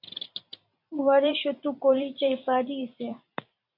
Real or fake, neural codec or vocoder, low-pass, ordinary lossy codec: fake; vocoder, 44.1 kHz, 128 mel bands every 256 samples, BigVGAN v2; 5.4 kHz; AAC, 48 kbps